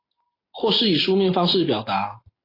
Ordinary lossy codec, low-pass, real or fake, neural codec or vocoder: AAC, 24 kbps; 5.4 kHz; real; none